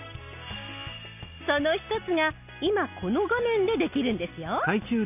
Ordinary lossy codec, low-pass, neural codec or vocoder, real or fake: none; 3.6 kHz; none; real